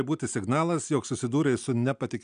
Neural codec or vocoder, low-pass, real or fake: none; 9.9 kHz; real